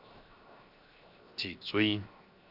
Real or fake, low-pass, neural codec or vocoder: fake; 5.4 kHz; codec, 16 kHz, 0.7 kbps, FocalCodec